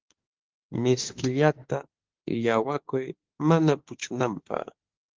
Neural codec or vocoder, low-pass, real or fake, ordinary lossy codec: autoencoder, 48 kHz, 32 numbers a frame, DAC-VAE, trained on Japanese speech; 7.2 kHz; fake; Opus, 16 kbps